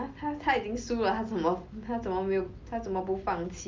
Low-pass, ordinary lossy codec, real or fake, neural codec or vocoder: 7.2 kHz; Opus, 24 kbps; real; none